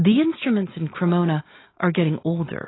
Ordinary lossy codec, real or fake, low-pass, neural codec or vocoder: AAC, 16 kbps; real; 7.2 kHz; none